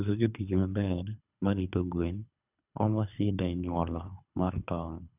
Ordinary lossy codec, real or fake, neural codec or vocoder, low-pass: none; fake; codec, 24 kHz, 3 kbps, HILCodec; 3.6 kHz